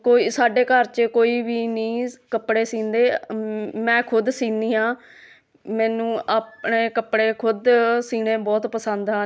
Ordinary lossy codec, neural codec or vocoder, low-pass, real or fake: none; none; none; real